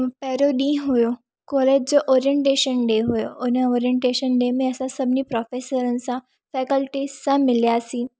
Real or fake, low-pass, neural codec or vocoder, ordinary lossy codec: real; none; none; none